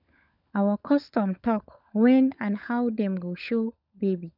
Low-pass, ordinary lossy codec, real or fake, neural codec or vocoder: 5.4 kHz; none; fake; codec, 16 kHz, 16 kbps, FunCodec, trained on LibriTTS, 50 frames a second